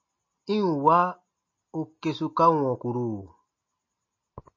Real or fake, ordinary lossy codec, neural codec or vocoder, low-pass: real; MP3, 32 kbps; none; 7.2 kHz